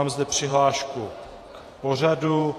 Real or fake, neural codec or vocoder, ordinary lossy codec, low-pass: fake; vocoder, 48 kHz, 128 mel bands, Vocos; AAC, 48 kbps; 14.4 kHz